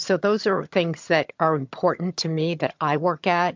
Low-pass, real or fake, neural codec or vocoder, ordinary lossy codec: 7.2 kHz; fake; vocoder, 22.05 kHz, 80 mel bands, HiFi-GAN; MP3, 64 kbps